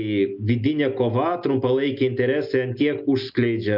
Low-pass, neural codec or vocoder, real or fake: 5.4 kHz; none; real